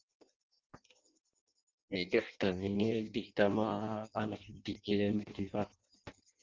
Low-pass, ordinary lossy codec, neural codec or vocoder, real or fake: 7.2 kHz; Opus, 32 kbps; codec, 16 kHz in and 24 kHz out, 0.6 kbps, FireRedTTS-2 codec; fake